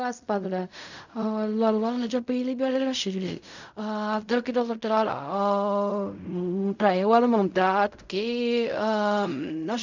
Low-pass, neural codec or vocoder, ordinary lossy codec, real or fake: 7.2 kHz; codec, 16 kHz in and 24 kHz out, 0.4 kbps, LongCat-Audio-Codec, fine tuned four codebook decoder; none; fake